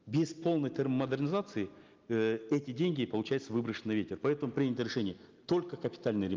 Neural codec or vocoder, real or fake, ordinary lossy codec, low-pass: none; real; Opus, 24 kbps; 7.2 kHz